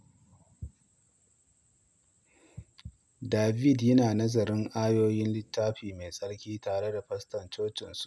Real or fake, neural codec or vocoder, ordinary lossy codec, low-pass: real; none; none; none